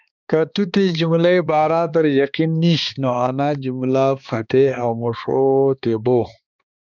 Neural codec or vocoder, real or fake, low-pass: codec, 16 kHz, 2 kbps, X-Codec, HuBERT features, trained on balanced general audio; fake; 7.2 kHz